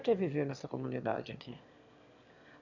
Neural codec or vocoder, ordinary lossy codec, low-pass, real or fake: autoencoder, 22.05 kHz, a latent of 192 numbers a frame, VITS, trained on one speaker; none; 7.2 kHz; fake